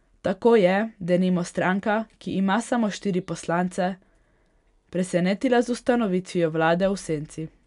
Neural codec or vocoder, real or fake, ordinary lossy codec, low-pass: none; real; none; 10.8 kHz